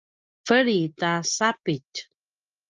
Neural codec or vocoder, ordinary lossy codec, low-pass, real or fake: none; Opus, 24 kbps; 7.2 kHz; real